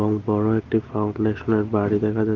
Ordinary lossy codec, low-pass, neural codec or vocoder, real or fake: Opus, 16 kbps; 7.2 kHz; none; real